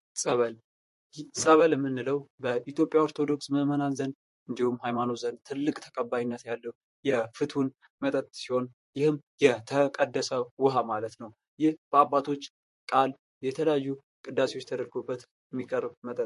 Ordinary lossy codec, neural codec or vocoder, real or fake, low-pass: MP3, 48 kbps; vocoder, 44.1 kHz, 128 mel bands, Pupu-Vocoder; fake; 14.4 kHz